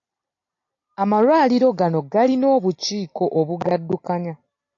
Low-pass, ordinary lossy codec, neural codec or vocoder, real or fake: 7.2 kHz; AAC, 48 kbps; none; real